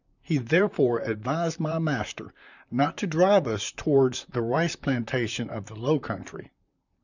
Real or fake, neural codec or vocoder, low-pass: fake; vocoder, 44.1 kHz, 128 mel bands, Pupu-Vocoder; 7.2 kHz